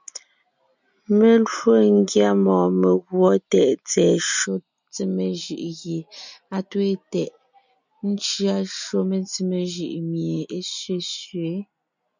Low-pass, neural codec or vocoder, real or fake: 7.2 kHz; none; real